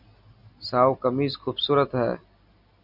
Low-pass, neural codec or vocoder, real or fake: 5.4 kHz; none; real